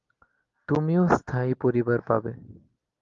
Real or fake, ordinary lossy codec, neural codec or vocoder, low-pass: real; Opus, 32 kbps; none; 7.2 kHz